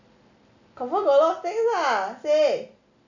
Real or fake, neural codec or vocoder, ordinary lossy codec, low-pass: real; none; none; 7.2 kHz